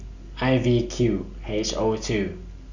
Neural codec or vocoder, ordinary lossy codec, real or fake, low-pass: none; Opus, 64 kbps; real; 7.2 kHz